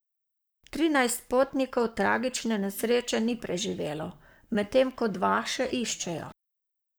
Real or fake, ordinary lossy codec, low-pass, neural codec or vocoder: fake; none; none; codec, 44.1 kHz, 7.8 kbps, Pupu-Codec